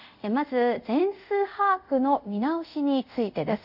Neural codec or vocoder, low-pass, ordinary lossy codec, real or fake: codec, 24 kHz, 0.5 kbps, DualCodec; 5.4 kHz; none; fake